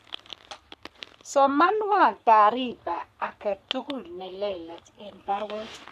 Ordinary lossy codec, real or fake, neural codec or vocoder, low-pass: none; fake; codec, 44.1 kHz, 3.4 kbps, Pupu-Codec; 14.4 kHz